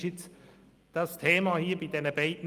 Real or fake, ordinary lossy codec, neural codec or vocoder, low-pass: real; Opus, 32 kbps; none; 14.4 kHz